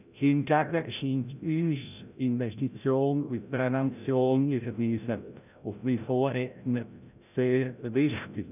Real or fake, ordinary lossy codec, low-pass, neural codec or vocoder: fake; none; 3.6 kHz; codec, 16 kHz, 0.5 kbps, FreqCodec, larger model